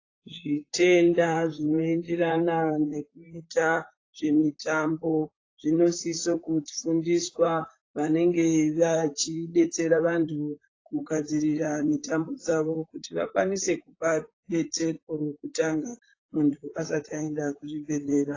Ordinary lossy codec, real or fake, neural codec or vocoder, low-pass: AAC, 32 kbps; fake; vocoder, 44.1 kHz, 128 mel bands, Pupu-Vocoder; 7.2 kHz